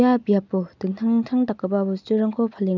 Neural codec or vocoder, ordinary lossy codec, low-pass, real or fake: none; none; 7.2 kHz; real